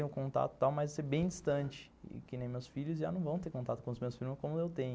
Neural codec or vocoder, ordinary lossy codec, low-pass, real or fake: none; none; none; real